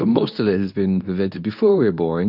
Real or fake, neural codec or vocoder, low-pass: fake; codec, 24 kHz, 0.9 kbps, WavTokenizer, medium speech release version 2; 5.4 kHz